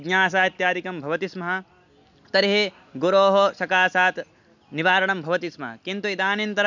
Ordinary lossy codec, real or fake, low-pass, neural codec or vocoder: none; real; 7.2 kHz; none